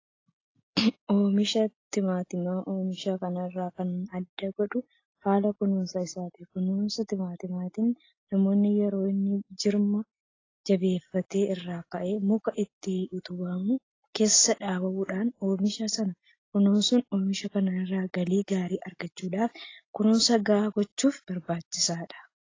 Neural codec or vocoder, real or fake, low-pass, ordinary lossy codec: none; real; 7.2 kHz; AAC, 32 kbps